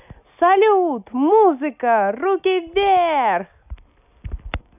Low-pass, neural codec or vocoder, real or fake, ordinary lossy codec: 3.6 kHz; none; real; none